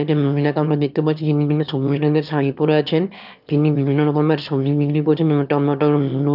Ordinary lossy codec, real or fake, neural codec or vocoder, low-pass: none; fake; autoencoder, 22.05 kHz, a latent of 192 numbers a frame, VITS, trained on one speaker; 5.4 kHz